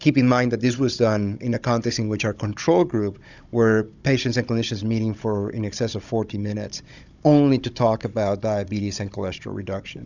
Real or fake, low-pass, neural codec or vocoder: fake; 7.2 kHz; codec, 16 kHz, 16 kbps, FunCodec, trained on Chinese and English, 50 frames a second